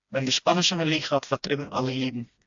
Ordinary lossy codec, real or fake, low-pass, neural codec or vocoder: AAC, 64 kbps; fake; 7.2 kHz; codec, 16 kHz, 1 kbps, FreqCodec, smaller model